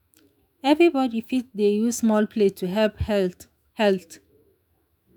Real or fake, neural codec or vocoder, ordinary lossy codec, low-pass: fake; autoencoder, 48 kHz, 128 numbers a frame, DAC-VAE, trained on Japanese speech; none; none